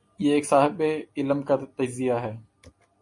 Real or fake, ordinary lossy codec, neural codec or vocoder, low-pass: real; MP3, 48 kbps; none; 10.8 kHz